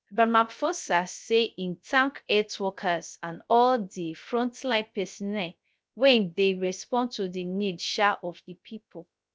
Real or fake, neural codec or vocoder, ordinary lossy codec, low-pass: fake; codec, 16 kHz, 0.3 kbps, FocalCodec; none; none